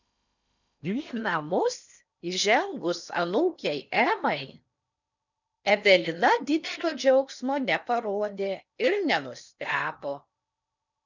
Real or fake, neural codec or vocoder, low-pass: fake; codec, 16 kHz in and 24 kHz out, 0.8 kbps, FocalCodec, streaming, 65536 codes; 7.2 kHz